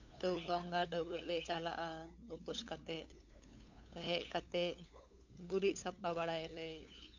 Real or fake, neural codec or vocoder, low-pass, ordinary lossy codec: fake; codec, 16 kHz, 8 kbps, FunCodec, trained on LibriTTS, 25 frames a second; 7.2 kHz; none